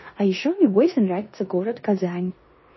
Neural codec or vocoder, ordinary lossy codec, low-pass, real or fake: codec, 16 kHz in and 24 kHz out, 0.9 kbps, LongCat-Audio-Codec, four codebook decoder; MP3, 24 kbps; 7.2 kHz; fake